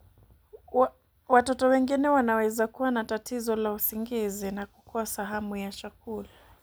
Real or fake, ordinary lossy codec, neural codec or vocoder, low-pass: real; none; none; none